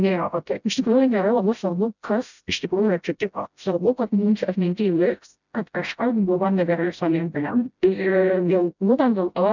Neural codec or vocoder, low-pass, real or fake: codec, 16 kHz, 0.5 kbps, FreqCodec, smaller model; 7.2 kHz; fake